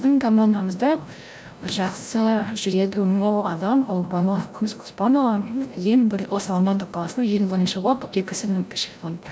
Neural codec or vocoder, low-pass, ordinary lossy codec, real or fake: codec, 16 kHz, 0.5 kbps, FreqCodec, larger model; none; none; fake